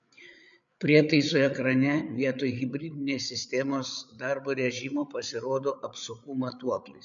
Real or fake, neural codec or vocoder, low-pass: fake; codec, 16 kHz, 8 kbps, FreqCodec, larger model; 7.2 kHz